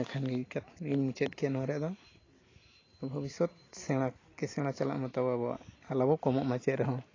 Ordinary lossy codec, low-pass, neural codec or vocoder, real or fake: AAC, 32 kbps; 7.2 kHz; none; real